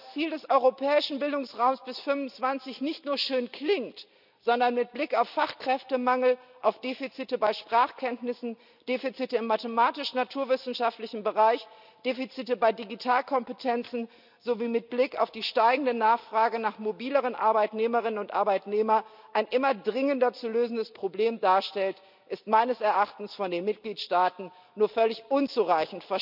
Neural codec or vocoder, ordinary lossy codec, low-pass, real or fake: none; none; 5.4 kHz; real